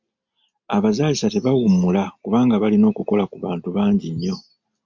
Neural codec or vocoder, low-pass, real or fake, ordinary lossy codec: none; 7.2 kHz; real; MP3, 64 kbps